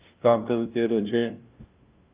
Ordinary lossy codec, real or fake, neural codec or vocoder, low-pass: Opus, 24 kbps; fake; codec, 16 kHz, 0.5 kbps, FunCodec, trained on LibriTTS, 25 frames a second; 3.6 kHz